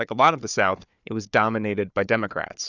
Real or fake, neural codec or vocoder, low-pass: fake; codec, 16 kHz, 4 kbps, FunCodec, trained on Chinese and English, 50 frames a second; 7.2 kHz